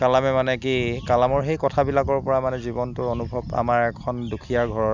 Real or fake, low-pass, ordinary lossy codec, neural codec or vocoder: real; 7.2 kHz; none; none